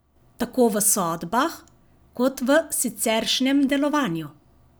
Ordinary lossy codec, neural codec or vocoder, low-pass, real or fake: none; none; none; real